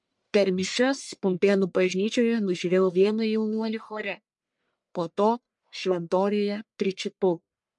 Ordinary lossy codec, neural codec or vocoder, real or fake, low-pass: MP3, 64 kbps; codec, 44.1 kHz, 1.7 kbps, Pupu-Codec; fake; 10.8 kHz